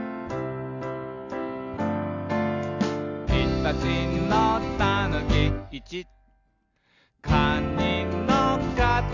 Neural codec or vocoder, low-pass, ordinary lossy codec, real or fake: none; 7.2 kHz; none; real